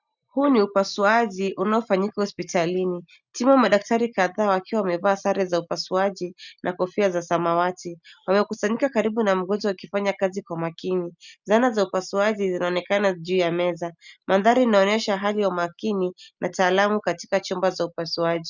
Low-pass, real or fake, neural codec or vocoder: 7.2 kHz; real; none